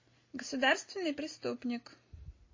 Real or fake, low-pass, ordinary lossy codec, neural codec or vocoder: real; 7.2 kHz; MP3, 32 kbps; none